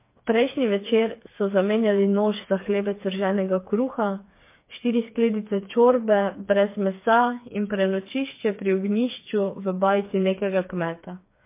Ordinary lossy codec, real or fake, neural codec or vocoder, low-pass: MP3, 24 kbps; fake; codec, 16 kHz, 4 kbps, FreqCodec, smaller model; 3.6 kHz